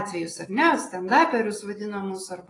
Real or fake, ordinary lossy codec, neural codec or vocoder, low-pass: real; AAC, 32 kbps; none; 10.8 kHz